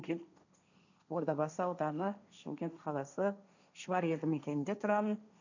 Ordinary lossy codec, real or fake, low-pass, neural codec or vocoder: none; fake; 7.2 kHz; codec, 16 kHz, 1.1 kbps, Voila-Tokenizer